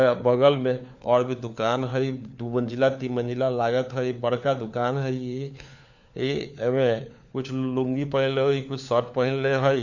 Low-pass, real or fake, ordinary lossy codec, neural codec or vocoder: 7.2 kHz; fake; none; codec, 16 kHz, 4 kbps, FunCodec, trained on LibriTTS, 50 frames a second